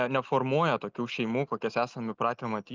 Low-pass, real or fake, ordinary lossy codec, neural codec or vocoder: 7.2 kHz; real; Opus, 24 kbps; none